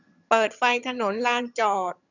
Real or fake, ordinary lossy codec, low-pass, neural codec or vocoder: fake; none; 7.2 kHz; vocoder, 22.05 kHz, 80 mel bands, HiFi-GAN